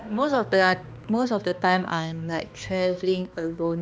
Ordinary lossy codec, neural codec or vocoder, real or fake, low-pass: none; codec, 16 kHz, 2 kbps, X-Codec, HuBERT features, trained on balanced general audio; fake; none